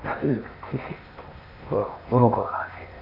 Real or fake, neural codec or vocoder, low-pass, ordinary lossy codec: fake; codec, 16 kHz in and 24 kHz out, 0.8 kbps, FocalCodec, streaming, 65536 codes; 5.4 kHz; none